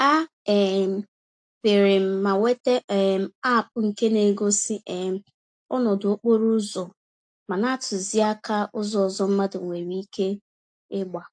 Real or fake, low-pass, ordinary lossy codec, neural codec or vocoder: real; 9.9 kHz; AAC, 64 kbps; none